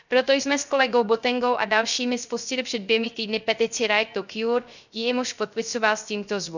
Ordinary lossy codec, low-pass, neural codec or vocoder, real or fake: none; 7.2 kHz; codec, 16 kHz, 0.3 kbps, FocalCodec; fake